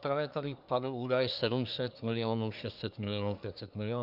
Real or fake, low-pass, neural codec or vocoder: fake; 5.4 kHz; codec, 24 kHz, 1 kbps, SNAC